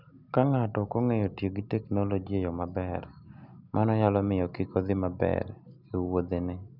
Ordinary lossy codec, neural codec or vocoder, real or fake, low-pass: none; none; real; 5.4 kHz